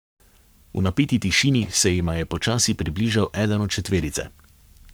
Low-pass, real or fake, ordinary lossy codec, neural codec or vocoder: none; fake; none; codec, 44.1 kHz, 7.8 kbps, Pupu-Codec